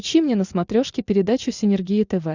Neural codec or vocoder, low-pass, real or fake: none; 7.2 kHz; real